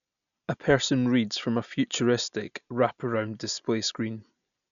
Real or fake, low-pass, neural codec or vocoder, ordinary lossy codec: real; 7.2 kHz; none; none